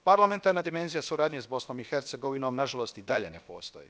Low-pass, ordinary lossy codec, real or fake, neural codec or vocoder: none; none; fake; codec, 16 kHz, about 1 kbps, DyCAST, with the encoder's durations